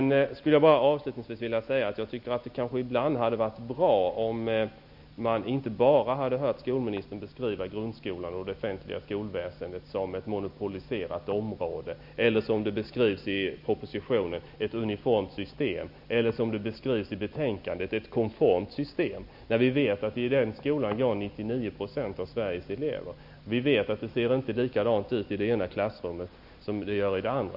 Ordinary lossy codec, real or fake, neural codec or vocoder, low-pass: none; real; none; 5.4 kHz